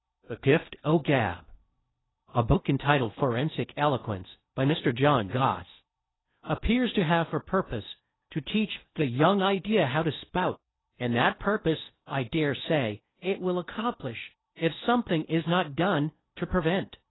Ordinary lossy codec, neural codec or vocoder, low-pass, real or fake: AAC, 16 kbps; codec, 16 kHz in and 24 kHz out, 0.8 kbps, FocalCodec, streaming, 65536 codes; 7.2 kHz; fake